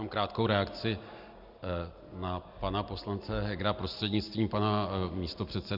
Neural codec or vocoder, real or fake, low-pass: none; real; 5.4 kHz